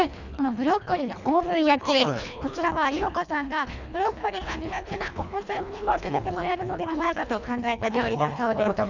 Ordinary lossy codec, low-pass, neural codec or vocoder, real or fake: none; 7.2 kHz; codec, 24 kHz, 1.5 kbps, HILCodec; fake